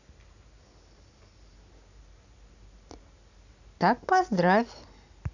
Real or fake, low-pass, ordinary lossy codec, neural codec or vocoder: real; 7.2 kHz; none; none